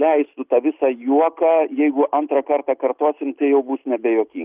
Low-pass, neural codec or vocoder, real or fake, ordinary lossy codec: 3.6 kHz; none; real; Opus, 24 kbps